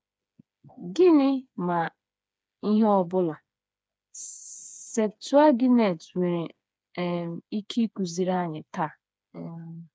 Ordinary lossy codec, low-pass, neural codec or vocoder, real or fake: none; none; codec, 16 kHz, 4 kbps, FreqCodec, smaller model; fake